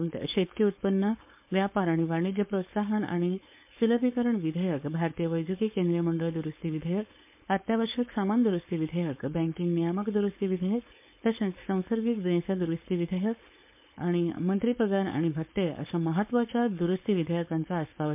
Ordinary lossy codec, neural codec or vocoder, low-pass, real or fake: MP3, 32 kbps; codec, 16 kHz, 4.8 kbps, FACodec; 3.6 kHz; fake